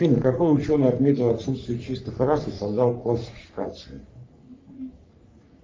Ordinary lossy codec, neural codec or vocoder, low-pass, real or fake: Opus, 32 kbps; codec, 44.1 kHz, 3.4 kbps, Pupu-Codec; 7.2 kHz; fake